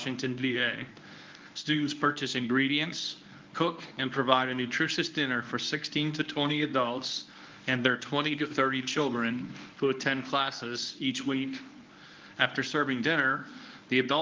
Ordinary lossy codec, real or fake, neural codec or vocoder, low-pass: Opus, 24 kbps; fake; codec, 24 kHz, 0.9 kbps, WavTokenizer, medium speech release version 1; 7.2 kHz